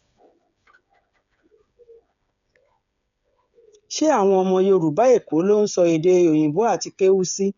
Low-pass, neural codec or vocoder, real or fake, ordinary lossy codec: 7.2 kHz; codec, 16 kHz, 8 kbps, FreqCodec, smaller model; fake; none